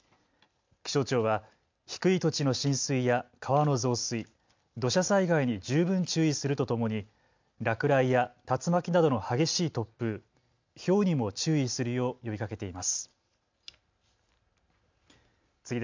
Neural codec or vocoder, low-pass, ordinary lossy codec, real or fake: none; 7.2 kHz; MP3, 64 kbps; real